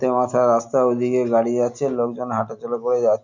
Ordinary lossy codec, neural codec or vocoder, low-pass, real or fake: none; none; 7.2 kHz; real